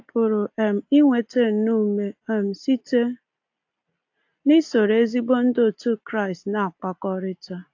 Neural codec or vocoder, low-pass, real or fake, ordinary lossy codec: none; 7.2 kHz; real; AAC, 48 kbps